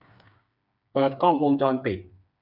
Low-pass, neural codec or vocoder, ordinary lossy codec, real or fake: 5.4 kHz; codec, 16 kHz, 4 kbps, FreqCodec, smaller model; none; fake